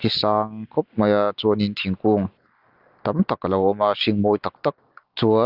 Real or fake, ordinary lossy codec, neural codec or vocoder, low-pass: real; Opus, 32 kbps; none; 5.4 kHz